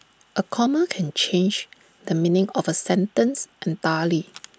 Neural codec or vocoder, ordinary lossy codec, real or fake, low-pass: none; none; real; none